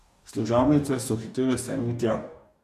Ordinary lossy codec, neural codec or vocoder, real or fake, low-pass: AAC, 96 kbps; codec, 44.1 kHz, 2.6 kbps, DAC; fake; 14.4 kHz